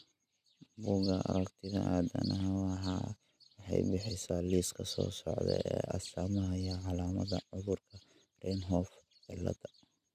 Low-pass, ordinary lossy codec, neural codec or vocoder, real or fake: 14.4 kHz; none; none; real